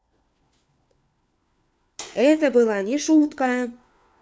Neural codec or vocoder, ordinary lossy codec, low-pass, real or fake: codec, 16 kHz, 4 kbps, FunCodec, trained on LibriTTS, 50 frames a second; none; none; fake